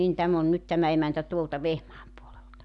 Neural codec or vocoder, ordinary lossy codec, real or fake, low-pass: none; none; real; 10.8 kHz